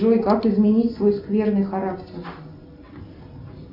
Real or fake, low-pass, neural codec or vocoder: real; 5.4 kHz; none